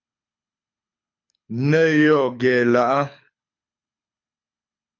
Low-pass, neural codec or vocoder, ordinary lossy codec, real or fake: 7.2 kHz; codec, 24 kHz, 6 kbps, HILCodec; MP3, 48 kbps; fake